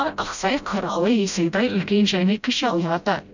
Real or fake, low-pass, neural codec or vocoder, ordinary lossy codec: fake; 7.2 kHz; codec, 16 kHz, 0.5 kbps, FreqCodec, smaller model; none